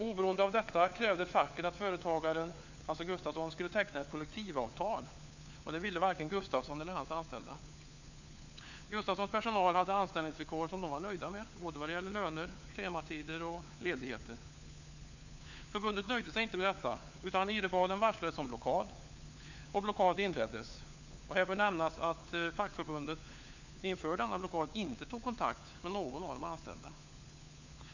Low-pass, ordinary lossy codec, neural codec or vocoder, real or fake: 7.2 kHz; none; codec, 16 kHz, 4 kbps, FunCodec, trained on LibriTTS, 50 frames a second; fake